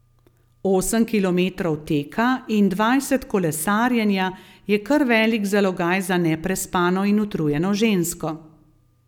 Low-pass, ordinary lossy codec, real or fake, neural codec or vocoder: 19.8 kHz; none; real; none